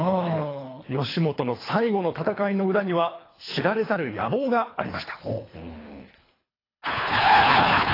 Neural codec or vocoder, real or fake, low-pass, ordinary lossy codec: codec, 24 kHz, 3 kbps, HILCodec; fake; 5.4 kHz; AAC, 24 kbps